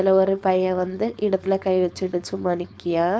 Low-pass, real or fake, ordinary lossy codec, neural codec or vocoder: none; fake; none; codec, 16 kHz, 4.8 kbps, FACodec